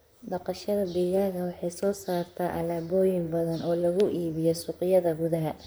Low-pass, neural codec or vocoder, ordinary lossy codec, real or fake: none; vocoder, 44.1 kHz, 128 mel bands, Pupu-Vocoder; none; fake